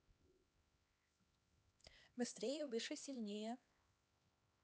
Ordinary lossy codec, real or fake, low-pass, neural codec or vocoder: none; fake; none; codec, 16 kHz, 2 kbps, X-Codec, HuBERT features, trained on LibriSpeech